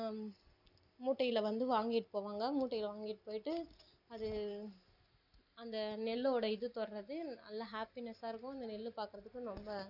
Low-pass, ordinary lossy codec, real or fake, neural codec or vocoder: 5.4 kHz; none; real; none